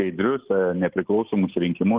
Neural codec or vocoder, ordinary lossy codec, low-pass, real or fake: none; Opus, 16 kbps; 3.6 kHz; real